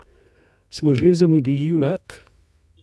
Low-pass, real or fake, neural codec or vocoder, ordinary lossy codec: none; fake; codec, 24 kHz, 0.9 kbps, WavTokenizer, medium music audio release; none